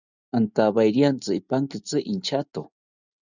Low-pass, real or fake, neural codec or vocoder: 7.2 kHz; real; none